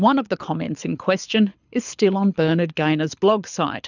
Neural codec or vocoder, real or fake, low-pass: codec, 24 kHz, 6 kbps, HILCodec; fake; 7.2 kHz